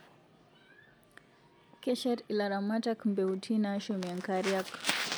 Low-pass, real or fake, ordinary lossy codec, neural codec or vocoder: none; real; none; none